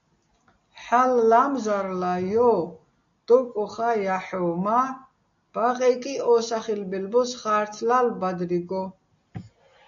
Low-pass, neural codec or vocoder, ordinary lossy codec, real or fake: 7.2 kHz; none; MP3, 64 kbps; real